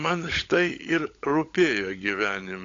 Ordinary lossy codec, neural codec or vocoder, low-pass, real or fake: AAC, 48 kbps; codec, 16 kHz, 16 kbps, FunCodec, trained on LibriTTS, 50 frames a second; 7.2 kHz; fake